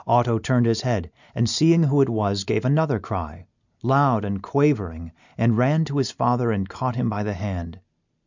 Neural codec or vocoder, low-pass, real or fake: none; 7.2 kHz; real